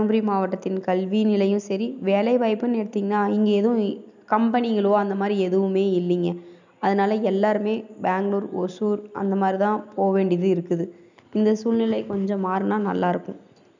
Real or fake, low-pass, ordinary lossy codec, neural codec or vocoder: real; 7.2 kHz; none; none